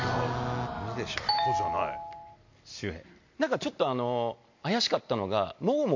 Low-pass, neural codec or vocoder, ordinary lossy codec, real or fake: 7.2 kHz; none; MP3, 48 kbps; real